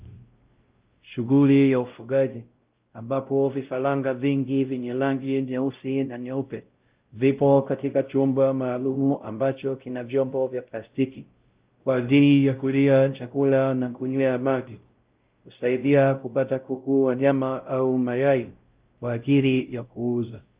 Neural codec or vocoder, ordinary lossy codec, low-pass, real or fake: codec, 16 kHz, 0.5 kbps, X-Codec, WavLM features, trained on Multilingual LibriSpeech; Opus, 32 kbps; 3.6 kHz; fake